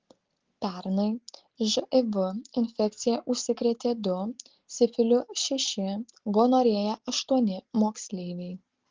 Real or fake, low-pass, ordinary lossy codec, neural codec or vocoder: real; 7.2 kHz; Opus, 16 kbps; none